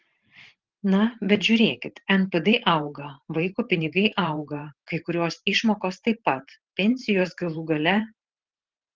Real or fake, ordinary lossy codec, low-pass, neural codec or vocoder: fake; Opus, 16 kbps; 7.2 kHz; vocoder, 44.1 kHz, 128 mel bands every 512 samples, BigVGAN v2